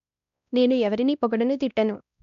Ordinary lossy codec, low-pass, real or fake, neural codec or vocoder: none; 7.2 kHz; fake; codec, 16 kHz, 1 kbps, X-Codec, WavLM features, trained on Multilingual LibriSpeech